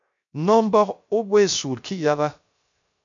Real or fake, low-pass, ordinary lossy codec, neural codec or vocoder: fake; 7.2 kHz; MP3, 64 kbps; codec, 16 kHz, 0.3 kbps, FocalCodec